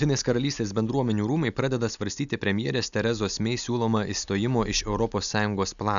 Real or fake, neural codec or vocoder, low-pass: real; none; 7.2 kHz